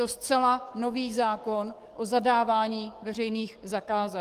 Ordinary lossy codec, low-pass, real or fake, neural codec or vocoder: Opus, 24 kbps; 14.4 kHz; fake; codec, 44.1 kHz, 7.8 kbps, DAC